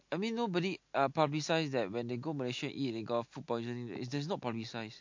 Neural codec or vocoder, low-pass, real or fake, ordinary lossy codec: none; 7.2 kHz; real; MP3, 48 kbps